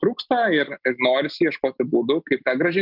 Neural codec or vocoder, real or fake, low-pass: none; real; 5.4 kHz